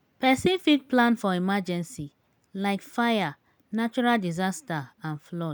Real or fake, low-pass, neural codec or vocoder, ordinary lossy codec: real; none; none; none